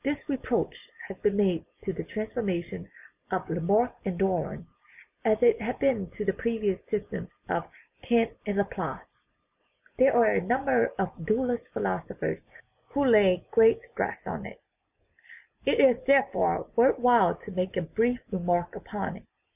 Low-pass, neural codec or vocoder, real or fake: 3.6 kHz; none; real